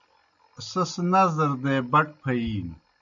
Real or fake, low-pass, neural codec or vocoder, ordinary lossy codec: real; 7.2 kHz; none; AAC, 64 kbps